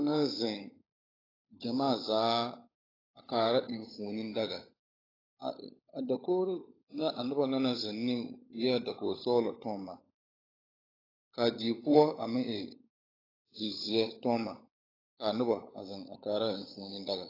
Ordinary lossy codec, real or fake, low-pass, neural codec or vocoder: AAC, 24 kbps; fake; 5.4 kHz; codec, 16 kHz, 16 kbps, FunCodec, trained on LibriTTS, 50 frames a second